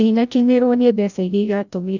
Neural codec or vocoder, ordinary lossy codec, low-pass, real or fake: codec, 16 kHz, 0.5 kbps, FreqCodec, larger model; none; 7.2 kHz; fake